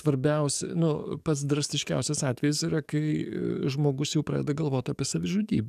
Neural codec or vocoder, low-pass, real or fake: codec, 44.1 kHz, 7.8 kbps, DAC; 14.4 kHz; fake